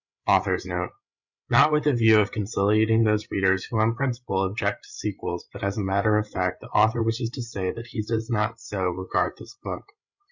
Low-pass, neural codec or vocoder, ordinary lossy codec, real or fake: 7.2 kHz; codec, 16 kHz, 8 kbps, FreqCodec, larger model; Opus, 64 kbps; fake